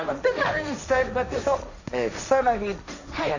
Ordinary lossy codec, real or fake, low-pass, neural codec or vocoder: none; fake; 7.2 kHz; codec, 16 kHz, 1.1 kbps, Voila-Tokenizer